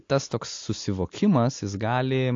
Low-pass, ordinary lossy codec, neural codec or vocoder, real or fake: 7.2 kHz; AAC, 48 kbps; none; real